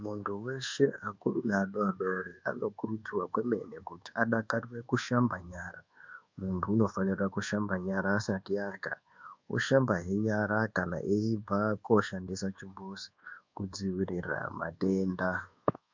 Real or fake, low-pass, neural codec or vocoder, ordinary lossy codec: fake; 7.2 kHz; codec, 24 kHz, 1.2 kbps, DualCodec; MP3, 64 kbps